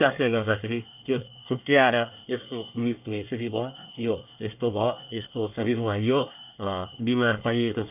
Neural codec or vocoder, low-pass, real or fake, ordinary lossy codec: codec, 24 kHz, 1 kbps, SNAC; 3.6 kHz; fake; none